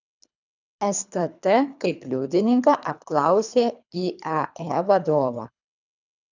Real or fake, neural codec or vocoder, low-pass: fake; codec, 24 kHz, 3 kbps, HILCodec; 7.2 kHz